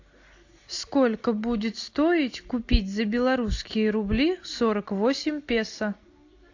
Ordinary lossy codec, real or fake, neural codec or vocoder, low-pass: AAC, 48 kbps; real; none; 7.2 kHz